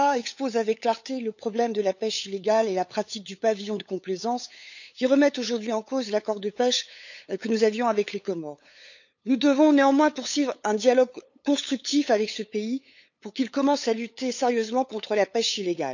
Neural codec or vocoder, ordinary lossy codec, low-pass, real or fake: codec, 16 kHz, 8 kbps, FunCodec, trained on LibriTTS, 25 frames a second; none; 7.2 kHz; fake